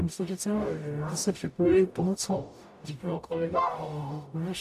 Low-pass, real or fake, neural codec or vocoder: 14.4 kHz; fake; codec, 44.1 kHz, 0.9 kbps, DAC